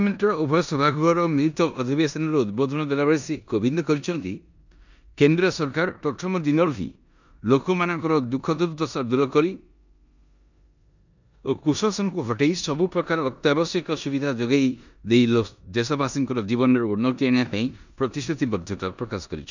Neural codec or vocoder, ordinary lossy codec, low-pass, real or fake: codec, 16 kHz in and 24 kHz out, 0.9 kbps, LongCat-Audio-Codec, four codebook decoder; none; 7.2 kHz; fake